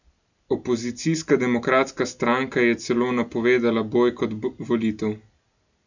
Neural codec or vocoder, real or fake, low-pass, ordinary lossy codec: none; real; 7.2 kHz; none